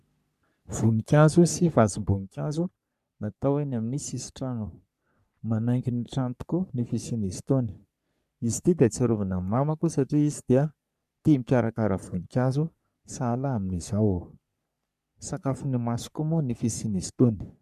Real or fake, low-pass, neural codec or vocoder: fake; 14.4 kHz; codec, 44.1 kHz, 3.4 kbps, Pupu-Codec